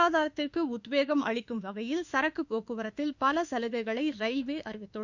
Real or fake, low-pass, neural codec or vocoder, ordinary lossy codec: fake; 7.2 kHz; autoencoder, 48 kHz, 32 numbers a frame, DAC-VAE, trained on Japanese speech; Opus, 64 kbps